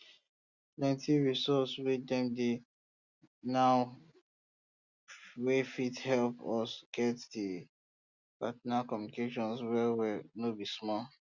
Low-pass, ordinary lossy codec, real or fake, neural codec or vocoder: 7.2 kHz; Opus, 64 kbps; real; none